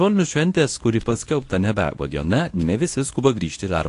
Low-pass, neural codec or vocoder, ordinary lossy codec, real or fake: 10.8 kHz; codec, 24 kHz, 0.9 kbps, WavTokenizer, medium speech release version 1; AAC, 48 kbps; fake